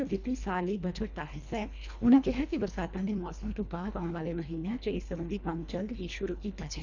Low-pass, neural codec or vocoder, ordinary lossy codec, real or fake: 7.2 kHz; codec, 24 kHz, 1.5 kbps, HILCodec; none; fake